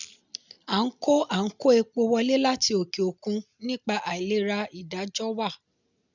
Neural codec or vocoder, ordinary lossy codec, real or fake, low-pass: none; none; real; 7.2 kHz